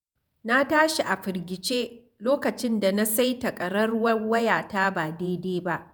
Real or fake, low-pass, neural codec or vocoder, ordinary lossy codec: fake; none; vocoder, 48 kHz, 128 mel bands, Vocos; none